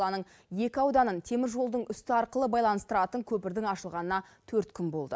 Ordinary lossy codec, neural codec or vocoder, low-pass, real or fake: none; none; none; real